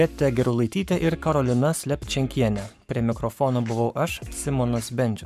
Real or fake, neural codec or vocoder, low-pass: fake; codec, 44.1 kHz, 7.8 kbps, Pupu-Codec; 14.4 kHz